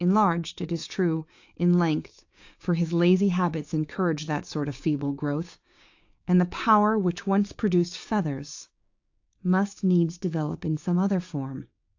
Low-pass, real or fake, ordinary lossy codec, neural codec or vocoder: 7.2 kHz; fake; AAC, 48 kbps; codec, 16 kHz, 6 kbps, DAC